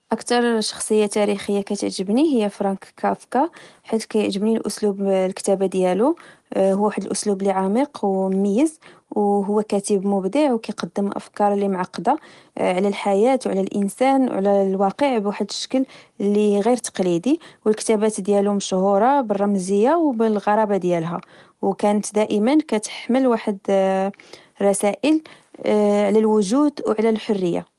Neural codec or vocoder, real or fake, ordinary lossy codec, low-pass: none; real; Opus, 24 kbps; 10.8 kHz